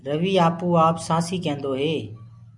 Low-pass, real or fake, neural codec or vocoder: 10.8 kHz; real; none